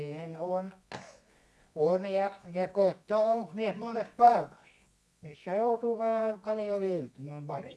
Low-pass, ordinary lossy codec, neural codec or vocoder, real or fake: none; none; codec, 24 kHz, 0.9 kbps, WavTokenizer, medium music audio release; fake